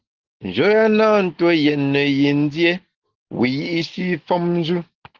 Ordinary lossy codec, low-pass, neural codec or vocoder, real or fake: Opus, 16 kbps; 7.2 kHz; none; real